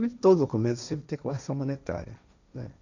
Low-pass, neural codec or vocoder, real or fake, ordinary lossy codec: 7.2 kHz; codec, 16 kHz, 1.1 kbps, Voila-Tokenizer; fake; none